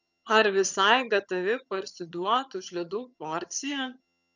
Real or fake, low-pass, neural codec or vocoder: fake; 7.2 kHz; vocoder, 22.05 kHz, 80 mel bands, HiFi-GAN